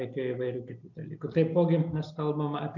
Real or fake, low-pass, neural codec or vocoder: real; 7.2 kHz; none